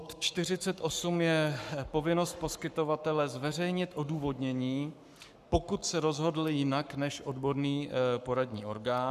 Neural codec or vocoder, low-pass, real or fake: codec, 44.1 kHz, 7.8 kbps, Pupu-Codec; 14.4 kHz; fake